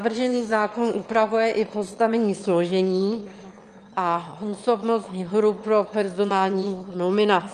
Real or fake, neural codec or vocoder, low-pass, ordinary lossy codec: fake; autoencoder, 22.05 kHz, a latent of 192 numbers a frame, VITS, trained on one speaker; 9.9 kHz; Opus, 32 kbps